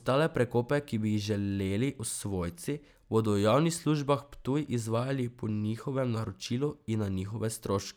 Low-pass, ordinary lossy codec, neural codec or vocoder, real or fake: none; none; none; real